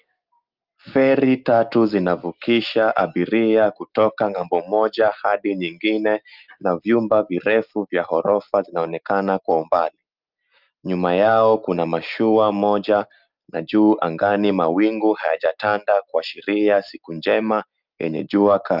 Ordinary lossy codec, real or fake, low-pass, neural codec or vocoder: Opus, 24 kbps; real; 5.4 kHz; none